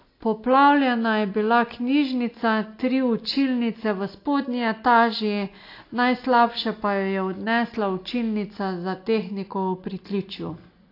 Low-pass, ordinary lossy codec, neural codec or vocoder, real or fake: 5.4 kHz; AAC, 32 kbps; none; real